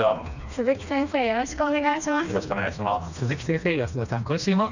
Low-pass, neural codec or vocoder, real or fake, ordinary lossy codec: 7.2 kHz; codec, 16 kHz, 2 kbps, FreqCodec, smaller model; fake; none